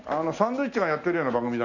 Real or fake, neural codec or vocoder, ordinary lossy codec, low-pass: real; none; none; 7.2 kHz